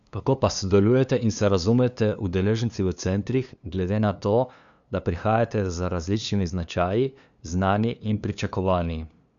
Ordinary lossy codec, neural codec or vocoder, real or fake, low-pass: none; codec, 16 kHz, 2 kbps, FunCodec, trained on LibriTTS, 25 frames a second; fake; 7.2 kHz